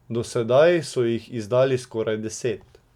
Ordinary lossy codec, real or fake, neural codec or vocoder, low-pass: none; real; none; 19.8 kHz